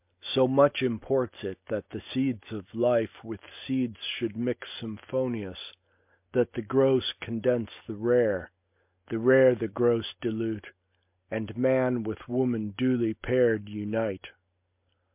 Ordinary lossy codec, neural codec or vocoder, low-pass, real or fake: MP3, 32 kbps; none; 3.6 kHz; real